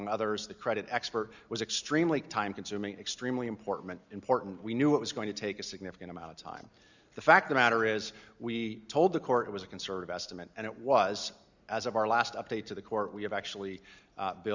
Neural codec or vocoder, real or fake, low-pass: none; real; 7.2 kHz